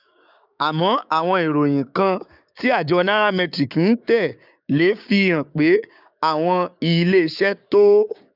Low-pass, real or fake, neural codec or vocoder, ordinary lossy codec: 5.4 kHz; fake; codec, 16 kHz, 6 kbps, DAC; none